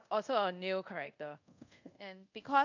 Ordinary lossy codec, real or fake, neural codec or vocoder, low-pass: none; fake; codec, 24 kHz, 0.5 kbps, DualCodec; 7.2 kHz